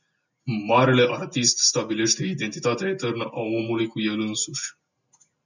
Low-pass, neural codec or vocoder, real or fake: 7.2 kHz; none; real